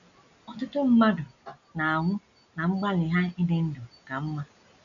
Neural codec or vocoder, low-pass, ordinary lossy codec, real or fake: none; 7.2 kHz; none; real